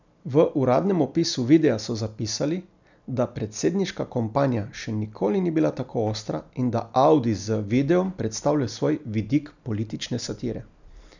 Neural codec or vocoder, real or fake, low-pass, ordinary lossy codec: none; real; 7.2 kHz; none